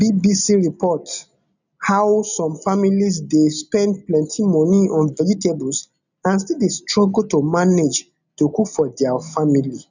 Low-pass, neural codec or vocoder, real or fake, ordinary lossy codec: 7.2 kHz; none; real; none